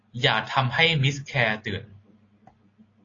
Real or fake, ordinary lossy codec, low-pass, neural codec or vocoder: real; AAC, 32 kbps; 7.2 kHz; none